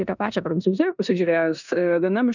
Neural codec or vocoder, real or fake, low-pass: codec, 16 kHz in and 24 kHz out, 0.9 kbps, LongCat-Audio-Codec, four codebook decoder; fake; 7.2 kHz